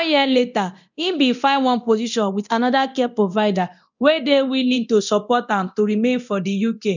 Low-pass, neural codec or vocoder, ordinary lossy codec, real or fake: 7.2 kHz; codec, 24 kHz, 0.9 kbps, DualCodec; none; fake